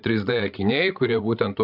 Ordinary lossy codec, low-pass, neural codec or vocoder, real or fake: AAC, 48 kbps; 5.4 kHz; codec, 16 kHz, 16 kbps, FreqCodec, larger model; fake